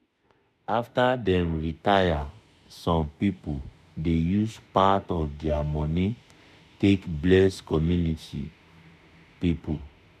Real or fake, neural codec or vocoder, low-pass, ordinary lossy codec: fake; autoencoder, 48 kHz, 32 numbers a frame, DAC-VAE, trained on Japanese speech; 14.4 kHz; none